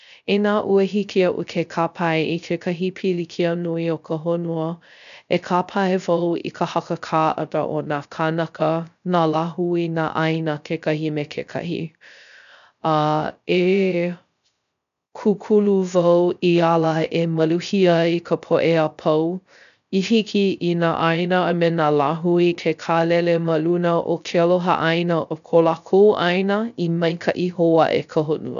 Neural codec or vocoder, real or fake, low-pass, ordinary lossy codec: codec, 16 kHz, 0.3 kbps, FocalCodec; fake; 7.2 kHz; none